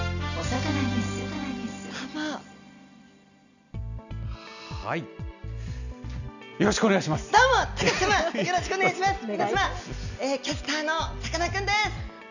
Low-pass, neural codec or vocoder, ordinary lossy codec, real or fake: 7.2 kHz; none; none; real